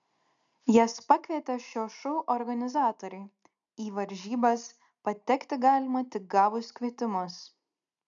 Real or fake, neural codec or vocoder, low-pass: real; none; 7.2 kHz